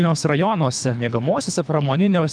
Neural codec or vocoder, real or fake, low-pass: codec, 24 kHz, 3 kbps, HILCodec; fake; 9.9 kHz